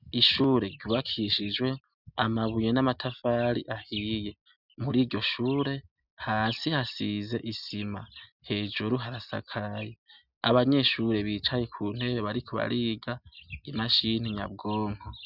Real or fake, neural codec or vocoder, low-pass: real; none; 5.4 kHz